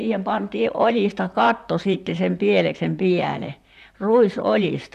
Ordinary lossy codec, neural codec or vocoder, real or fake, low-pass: none; vocoder, 44.1 kHz, 128 mel bands, Pupu-Vocoder; fake; 14.4 kHz